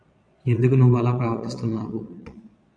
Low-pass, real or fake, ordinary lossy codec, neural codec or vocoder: 9.9 kHz; fake; AAC, 64 kbps; vocoder, 22.05 kHz, 80 mel bands, Vocos